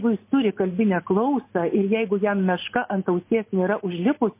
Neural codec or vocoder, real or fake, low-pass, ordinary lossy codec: none; real; 3.6 kHz; MP3, 24 kbps